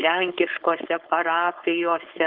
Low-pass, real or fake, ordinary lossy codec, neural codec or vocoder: 5.4 kHz; fake; Opus, 16 kbps; codec, 16 kHz, 4.8 kbps, FACodec